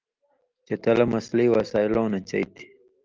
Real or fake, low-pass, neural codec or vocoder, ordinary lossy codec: real; 7.2 kHz; none; Opus, 32 kbps